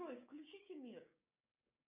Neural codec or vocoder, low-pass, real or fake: codec, 16 kHz, 6 kbps, DAC; 3.6 kHz; fake